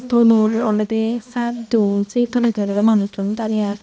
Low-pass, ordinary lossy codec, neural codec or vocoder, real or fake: none; none; codec, 16 kHz, 1 kbps, X-Codec, HuBERT features, trained on balanced general audio; fake